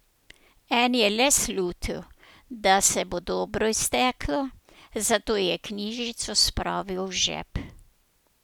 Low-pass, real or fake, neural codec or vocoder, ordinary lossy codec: none; real; none; none